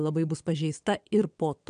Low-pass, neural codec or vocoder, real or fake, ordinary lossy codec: 9.9 kHz; vocoder, 22.05 kHz, 80 mel bands, WaveNeXt; fake; AAC, 96 kbps